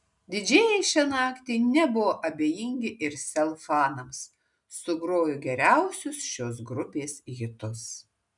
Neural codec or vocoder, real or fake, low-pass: none; real; 10.8 kHz